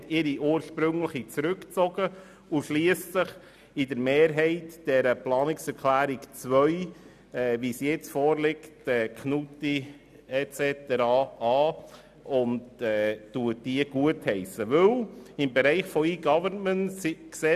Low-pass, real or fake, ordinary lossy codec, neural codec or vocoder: 14.4 kHz; real; none; none